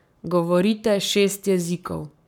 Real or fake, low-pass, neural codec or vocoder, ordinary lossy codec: fake; 19.8 kHz; codec, 44.1 kHz, 7.8 kbps, Pupu-Codec; none